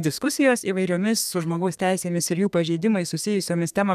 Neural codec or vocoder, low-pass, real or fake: codec, 32 kHz, 1.9 kbps, SNAC; 14.4 kHz; fake